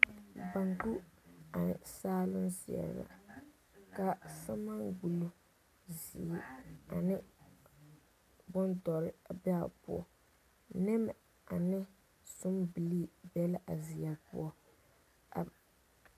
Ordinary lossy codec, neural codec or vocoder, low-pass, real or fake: AAC, 96 kbps; none; 14.4 kHz; real